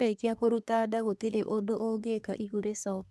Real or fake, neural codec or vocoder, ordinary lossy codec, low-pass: fake; codec, 24 kHz, 1 kbps, SNAC; none; none